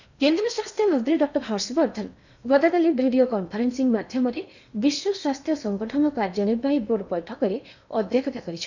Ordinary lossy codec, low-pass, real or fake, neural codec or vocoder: none; 7.2 kHz; fake; codec, 16 kHz in and 24 kHz out, 0.8 kbps, FocalCodec, streaming, 65536 codes